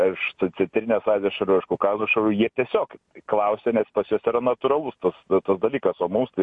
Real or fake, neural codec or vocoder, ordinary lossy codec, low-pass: real; none; MP3, 48 kbps; 10.8 kHz